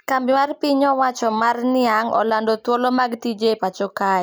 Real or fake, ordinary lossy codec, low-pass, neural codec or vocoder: real; none; none; none